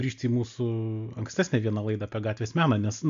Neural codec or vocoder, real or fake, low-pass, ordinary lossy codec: none; real; 7.2 kHz; MP3, 64 kbps